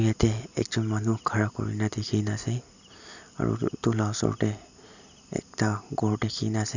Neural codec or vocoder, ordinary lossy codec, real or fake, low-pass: none; none; real; 7.2 kHz